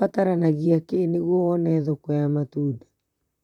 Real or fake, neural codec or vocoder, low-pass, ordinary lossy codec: fake; vocoder, 44.1 kHz, 128 mel bands, Pupu-Vocoder; 19.8 kHz; none